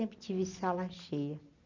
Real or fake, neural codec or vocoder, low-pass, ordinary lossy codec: real; none; 7.2 kHz; none